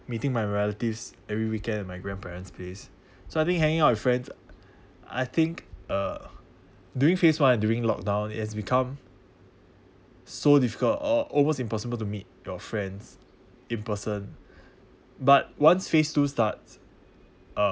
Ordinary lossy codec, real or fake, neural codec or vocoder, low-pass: none; real; none; none